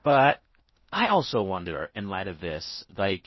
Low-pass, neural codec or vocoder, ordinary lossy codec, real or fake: 7.2 kHz; codec, 16 kHz in and 24 kHz out, 0.6 kbps, FocalCodec, streaming, 4096 codes; MP3, 24 kbps; fake